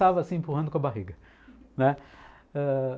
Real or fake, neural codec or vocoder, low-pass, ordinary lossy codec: real; none; none; none